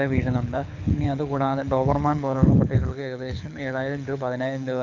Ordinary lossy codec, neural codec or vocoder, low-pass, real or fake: none; codec, 24 kHz, 6 kbps, HILCodec; 7.2 kHz; fake